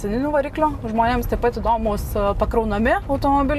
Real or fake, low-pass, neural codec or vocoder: real; 14.4 kHz; none